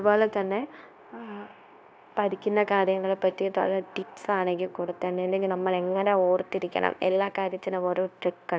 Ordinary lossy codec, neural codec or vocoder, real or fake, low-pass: none; codec, 16 kHz, 0.9 kbps, LongCat-Audio-Codec; fake; none